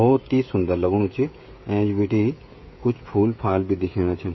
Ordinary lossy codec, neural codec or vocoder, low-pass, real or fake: MP3, 24 kbps; codec, 16 kHz, 16 kbps, FreqCodec, smaller model; 7.2 kHz; fake